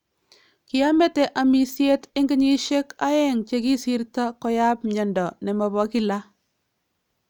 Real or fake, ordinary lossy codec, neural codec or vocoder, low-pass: real; none; none; 19.8 kHz